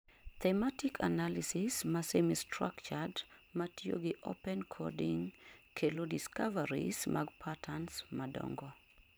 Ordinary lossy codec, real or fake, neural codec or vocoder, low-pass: none; fake; vocoder, 44.1 kHz, 128 mel bands every 512 samples, BigVGAN v2; none